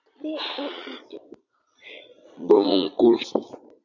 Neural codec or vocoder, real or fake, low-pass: vocoder, 22.05 kHz, 80 mel bands, Vocos; fake; 7.2 kHz